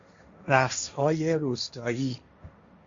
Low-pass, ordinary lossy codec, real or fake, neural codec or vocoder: 7.2 kHz; Opus, 64 kbps; fake; codec, 16 kHz, 1.1 kbps, Voila-Tokenizer